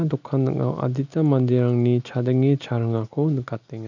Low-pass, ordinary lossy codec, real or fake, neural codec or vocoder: 7.2 kHz; none; real; none